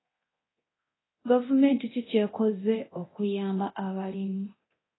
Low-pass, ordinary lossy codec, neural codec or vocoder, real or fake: 7.2 kHz; AAC, 16 kbps; codec, 24 kHz, 0.9 kbps, DualCodec; fake